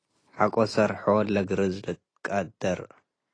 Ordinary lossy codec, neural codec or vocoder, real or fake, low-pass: AAC, 32 kbps; none; real; 9.9 kHz